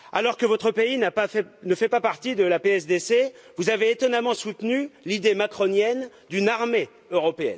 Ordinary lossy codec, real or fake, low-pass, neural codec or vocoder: none; real; none; none